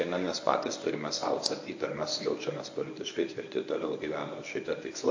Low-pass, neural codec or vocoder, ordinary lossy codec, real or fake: 7.2 kHz; codec, 24 kHz, 0.9 kbps, WavTokenizer, medium speech release version 1; AAC, 48 kbps; fake